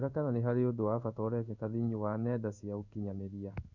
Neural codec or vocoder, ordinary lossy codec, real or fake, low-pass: codec, 16 kHz in and 24 kHz out, 1 kbps, XY-Tokenizer; none; fake; 7.2 kHz